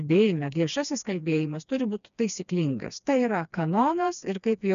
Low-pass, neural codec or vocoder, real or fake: 7.2 kHz; codec, 16 kHz, 2 kbps, FreqCodec, smaller model; fake